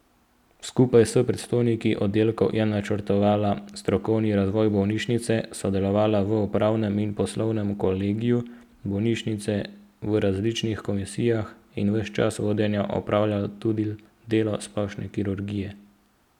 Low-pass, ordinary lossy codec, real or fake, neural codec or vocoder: 19.8 kHz; none; real; none